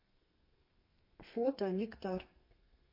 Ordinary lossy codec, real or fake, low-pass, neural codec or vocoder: MP3, 32 kbps; fake; 5.4 kHz; codec, 32 kHz, 1.9 kbps, SNAC